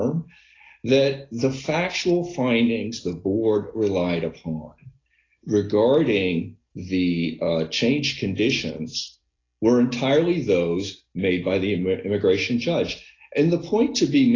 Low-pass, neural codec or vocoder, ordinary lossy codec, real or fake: 7.2 kHz; none; AAC, 32 kbps; real